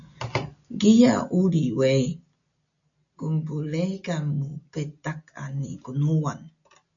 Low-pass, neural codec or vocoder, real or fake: 7.2 kHz; none; real